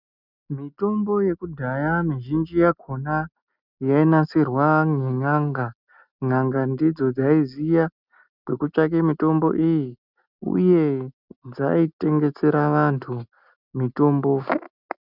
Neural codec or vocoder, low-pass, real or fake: none; 5.4 kHz; real